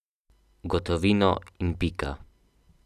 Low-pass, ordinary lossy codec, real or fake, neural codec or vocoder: 14.4 kHz; none; real; none